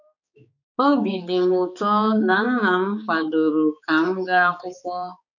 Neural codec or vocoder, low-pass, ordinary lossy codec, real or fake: codec, 16 kHz, 4 kbps, X-Codec, HuBERT features, trained on balanced general audio; 7.2 kHz; none; fake